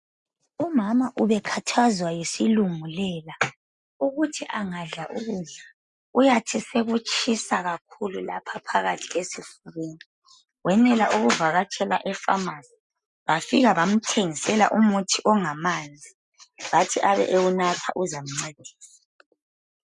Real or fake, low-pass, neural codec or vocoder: real; 10.8 kHz; none